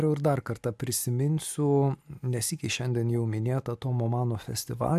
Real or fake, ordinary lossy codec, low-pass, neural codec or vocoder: real; AAC, 96 kbps; 14.4 kHz; none